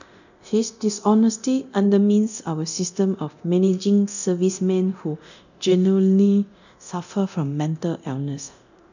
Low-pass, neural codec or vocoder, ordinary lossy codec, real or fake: 7.2 kHz; codec, 24 kHz, 0.9 kbps, DualCodec; none; fake